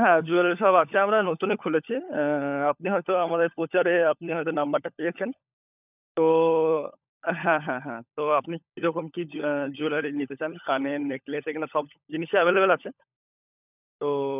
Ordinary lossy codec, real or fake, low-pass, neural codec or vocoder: none; fake; 3.6 kHz; codec, 16 kHz, 16 kbps, FunCodec, trained on LibriTTS, 50 frames a second